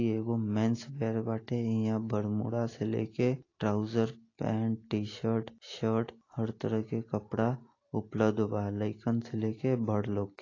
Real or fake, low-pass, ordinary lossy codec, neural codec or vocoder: real; 7.2 kHz; AAC, 32 kbps; none